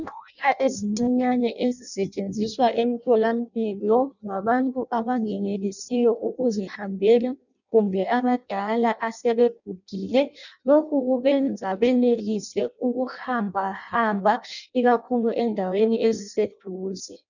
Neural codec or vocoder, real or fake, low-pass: codec, 16 kHz in and 24 kHz out, 0.6 kbps, FireRedTTS-2 codec; fake; 7.2 kHz